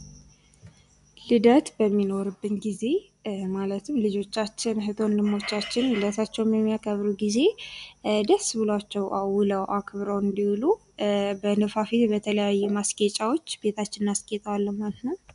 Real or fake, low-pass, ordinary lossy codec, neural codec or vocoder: real; 10.8 kHz; MP3, 96 kbps; none